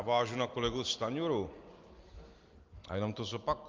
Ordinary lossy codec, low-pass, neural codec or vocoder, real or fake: Opus, 24 kbps; 7.2 kHz; none; real